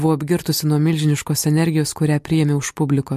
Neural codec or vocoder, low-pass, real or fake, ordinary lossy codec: none; 14.4 kHz; real; MP3, 64 kbps